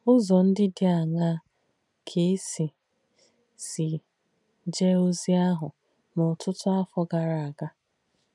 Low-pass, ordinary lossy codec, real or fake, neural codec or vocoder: 10.8 kHz; none; real; none